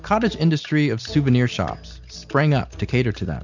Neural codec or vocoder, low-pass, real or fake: none; 7.2 kHz; real